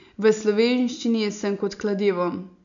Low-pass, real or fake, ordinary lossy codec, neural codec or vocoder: 7.2 kHz; real; none; none